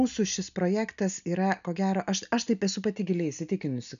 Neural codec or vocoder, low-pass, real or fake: none; 7.2 kHz; real